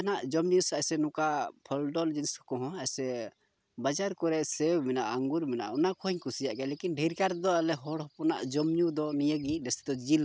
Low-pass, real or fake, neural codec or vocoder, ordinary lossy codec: none; real; none; none